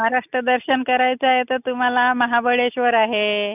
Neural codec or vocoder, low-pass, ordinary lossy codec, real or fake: none; 3.6 kHz; none; real